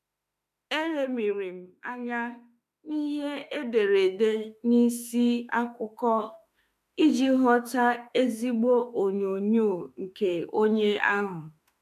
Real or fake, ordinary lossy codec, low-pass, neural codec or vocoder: fake; none; 14.4 kHz; autoencoder, 48 kHz, 32 numbers a frame, DAC-VAE, trained on Japanese speech